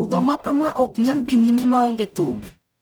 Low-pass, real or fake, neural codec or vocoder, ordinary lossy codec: none; fake; codec, 44.1 kHz, 0.9 kbps, DAC; none